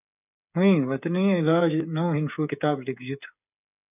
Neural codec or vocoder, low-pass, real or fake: codec, 16 kHz, 8 kbps, FreqCodec, smaller model; 3.6 kHz; fake